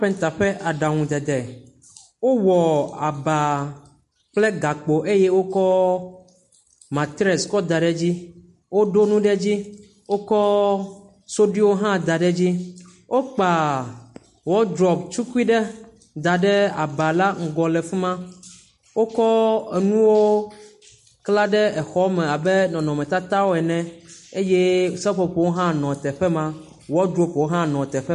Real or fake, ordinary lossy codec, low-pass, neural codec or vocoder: real; MP3, 48 kbps; 14.4 kHz; none